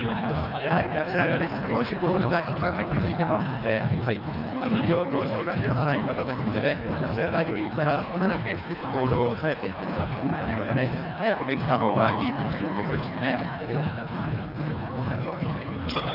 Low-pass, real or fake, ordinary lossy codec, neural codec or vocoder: 5.4 kHz; fake; none; codec, 24 kHz, 1.5 kbps, HILCodec